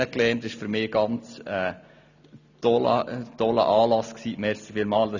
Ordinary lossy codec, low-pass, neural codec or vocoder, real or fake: none; 7.2 kHz; none; real